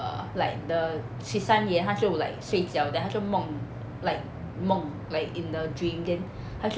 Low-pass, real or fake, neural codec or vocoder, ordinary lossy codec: none; real; none; none